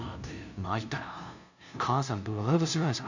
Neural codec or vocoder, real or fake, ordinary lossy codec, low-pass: codec, 16 kHz, 0.5 kbps, FunCodec, trained on LibriTTS, 25 frames a second; fake; none; 7.2 kHz